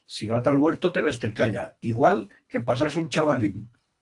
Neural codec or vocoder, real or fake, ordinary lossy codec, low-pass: codec, 24 kHz, 1.5 kbps, HILCodec; fake; AAC, 64 kbps; 10.8 kHz